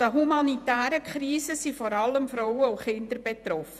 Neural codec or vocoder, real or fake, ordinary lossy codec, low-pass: vocoder, 48 kHz, 128 mel bands, Vocos; fake; none; 14.4 kHz